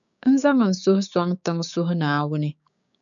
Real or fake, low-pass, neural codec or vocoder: fake; 7.2 kHz; codec, 16 kHz, 6 kbps, DAC